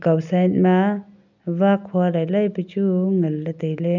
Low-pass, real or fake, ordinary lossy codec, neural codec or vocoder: 7.2 kHz; real; none; none